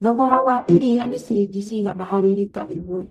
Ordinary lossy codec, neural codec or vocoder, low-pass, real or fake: none; codec, 44.1 kHz, 0.9 kbps, DAC; 14.4 kHz; fake